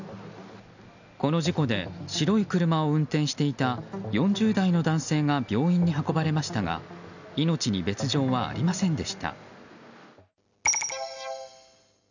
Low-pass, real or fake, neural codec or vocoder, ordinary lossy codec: 7.2 kHz; real; none; none